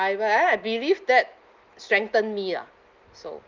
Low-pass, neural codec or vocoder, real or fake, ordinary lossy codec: 7.2 kHz; none; real; Opus, 24 kbps